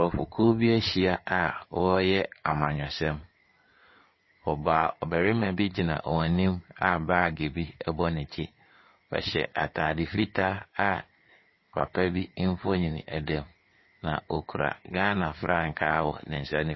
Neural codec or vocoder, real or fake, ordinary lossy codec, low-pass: codec, 16 kHz in and 24 kHz out, 2.2 kbps, FireRedTTS-2 codec; fake; MP3, 24 kbps; 7.2 kHz